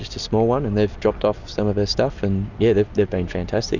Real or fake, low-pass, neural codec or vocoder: real; 7.2 kHz; none